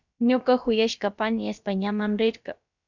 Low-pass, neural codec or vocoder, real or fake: 7.2 kHz; codec, 16 kHz, about 1 kbps, DyCAST, with the encoder's durations; fake